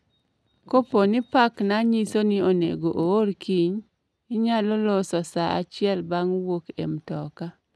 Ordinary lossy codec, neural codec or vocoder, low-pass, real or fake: none; none; none; real